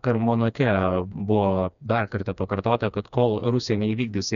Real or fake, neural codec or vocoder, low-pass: fake; codec, 16 kHz, 2 kbps, FreqCodec, smaller model; 7.2 kHz